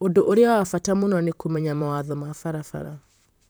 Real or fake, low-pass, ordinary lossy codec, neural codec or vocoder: fake; none; none; vocoder, 44.1 kHz, 128 mel bands, Pupu-Vocoder